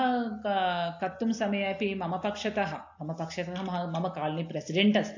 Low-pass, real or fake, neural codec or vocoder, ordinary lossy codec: 7.2 kHz; real; none; AAC, 48 kbps